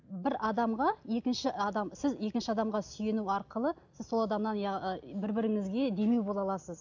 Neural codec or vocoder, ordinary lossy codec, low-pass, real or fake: none; none; 7.2 kHz; real